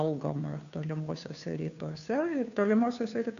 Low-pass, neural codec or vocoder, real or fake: 7.2 kHz; codec, 16 kHz, 2 kbps, FunCodec, trained on Chinese and English, 25 frames a second; fake